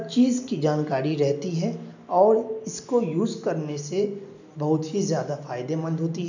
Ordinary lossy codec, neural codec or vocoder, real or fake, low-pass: none; none; real; 7.2 kHz